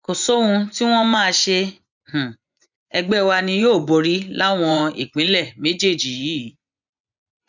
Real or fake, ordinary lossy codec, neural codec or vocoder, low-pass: fake; none; vocoder, 24 kHz, 100 mel bands, Vocos; 7.2 kHz